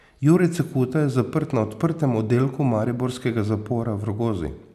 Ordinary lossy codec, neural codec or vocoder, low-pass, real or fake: none; none; 14.4 kHz; real